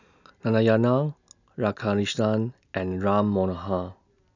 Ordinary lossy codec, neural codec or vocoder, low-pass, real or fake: none; none; 7.2 kHz; real